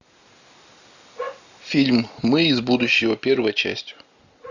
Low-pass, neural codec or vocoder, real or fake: 7.2 kHz; none; real